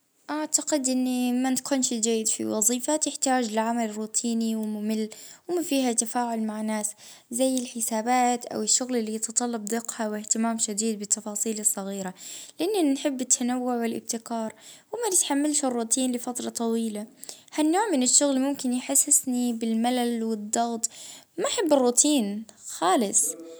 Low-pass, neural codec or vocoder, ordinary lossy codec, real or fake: none; none; none; real